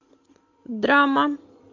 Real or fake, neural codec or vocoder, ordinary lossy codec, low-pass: real; none; MP3, 48 kbps; 7.2 kHz